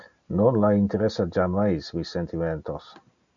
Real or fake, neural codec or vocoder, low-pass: real; none; 7.2 kHz